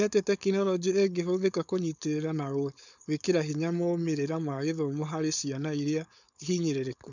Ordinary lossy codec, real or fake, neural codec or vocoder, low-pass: none; fake; codec, 16 kHz, 4.8 kbps, FACodec; 7.2 kHz